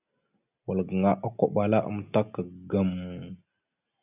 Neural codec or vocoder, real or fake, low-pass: none; real; 3.6 kHz